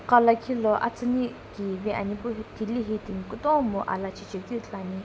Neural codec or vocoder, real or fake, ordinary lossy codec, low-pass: none; real; none; none